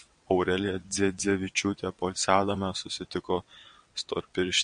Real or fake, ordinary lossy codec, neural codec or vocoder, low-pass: fake; MP3, 48 kbps; vocoder, 22.05 kHz, 80 mel bands, WaveNeXt; 9.9 kHz